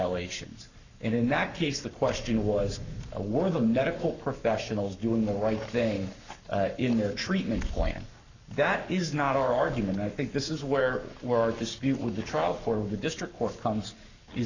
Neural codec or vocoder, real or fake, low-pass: codec, 44.1 kHz, 7.8 kbps, Pupu-Codec; fake; 7.2 kHz